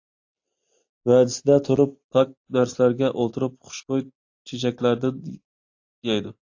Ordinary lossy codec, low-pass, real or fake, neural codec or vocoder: AAC, 48 kbps; 7.2 kHz; real; none